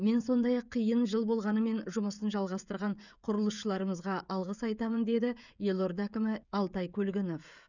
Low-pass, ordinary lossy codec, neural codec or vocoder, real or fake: 7.2 kHz; none; codec, 16 kHz, 16 kbps, FreqCodec, smaller model; fake